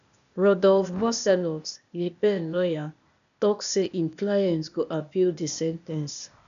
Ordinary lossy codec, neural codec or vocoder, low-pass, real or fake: none; codec, 16 kHz, 0.8 kbps, ZipCodec; 7.2 kHz; fake